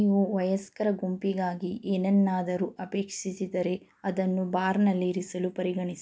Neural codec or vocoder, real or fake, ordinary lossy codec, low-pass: none; real; none; none